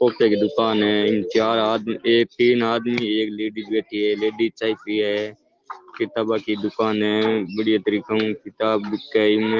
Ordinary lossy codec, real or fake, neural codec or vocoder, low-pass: Opus, 16 kbps; real; none; 7.2 kHz